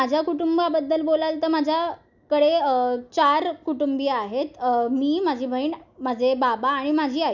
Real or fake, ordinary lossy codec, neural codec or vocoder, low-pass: real; none; none; 7.2 kHz